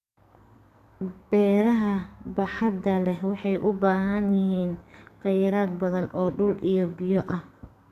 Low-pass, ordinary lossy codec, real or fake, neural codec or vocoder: 14.4 kHz; none; fake; codec, 44.1 kHz, 2.6 kbps, SNAC